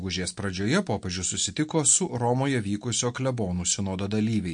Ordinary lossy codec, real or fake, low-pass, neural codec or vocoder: MP3, 48 kbps; real; 9.9 kHz; none